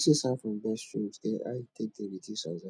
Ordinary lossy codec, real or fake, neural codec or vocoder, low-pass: none; real; none; none